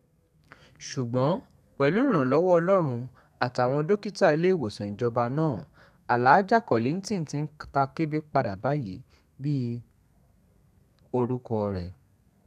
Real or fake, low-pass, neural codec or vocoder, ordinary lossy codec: fake; 14.4 kHz; codec, 32 kHz, 1.9 kbps, SNAC; none